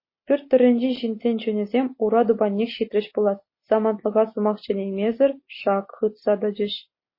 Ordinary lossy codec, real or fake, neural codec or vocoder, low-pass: MP3, 24 kbps; real; none; 5.4 kHz